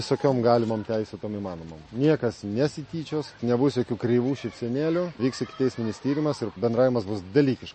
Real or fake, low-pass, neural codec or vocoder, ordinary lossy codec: real; 10.8 kHz; none; MP3, 32 kbps